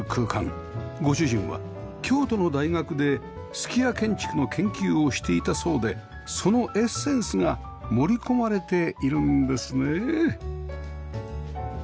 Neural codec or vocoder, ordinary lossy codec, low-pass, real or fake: none; none; none; real